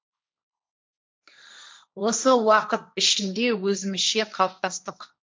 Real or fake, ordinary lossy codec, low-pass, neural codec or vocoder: fake; none; none; codec, 16 kHz, 1.1 kbps, Voila-Tokenizer